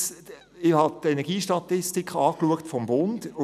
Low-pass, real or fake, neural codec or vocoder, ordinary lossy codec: 14.4 kHz; real; none; none